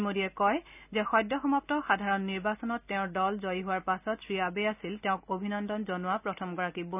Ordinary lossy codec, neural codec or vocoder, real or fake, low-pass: none; none; real; 3.6 kHz